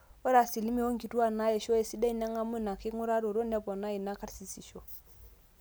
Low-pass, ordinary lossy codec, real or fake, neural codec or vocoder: none; none; real; none